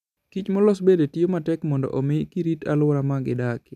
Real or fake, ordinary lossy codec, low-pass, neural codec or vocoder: real; none; 14.4 kHz; none